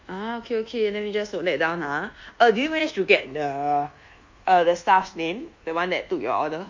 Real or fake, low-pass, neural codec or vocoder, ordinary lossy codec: fake; 7.2 kHz; codec, 24 kHz, 1.2 kbps, DualCodec; MP3, 48 kbps